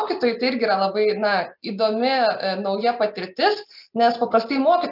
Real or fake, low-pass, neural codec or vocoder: real; 5.4 kHz; none